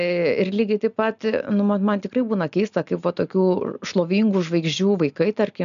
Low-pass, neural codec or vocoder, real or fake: 7.2 kHz; none; real